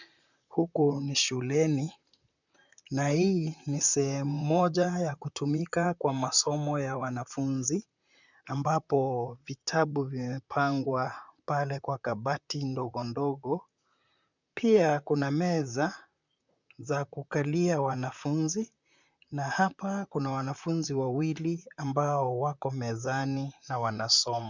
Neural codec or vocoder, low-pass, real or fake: vocoder, 44.1 kHz, 128 mel bands, Pupu-Vocoder; 7.2 kHz; fake